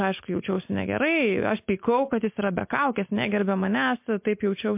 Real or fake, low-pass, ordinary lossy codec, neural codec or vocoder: real; 3.6 kHz; MP3, 32 kbps; none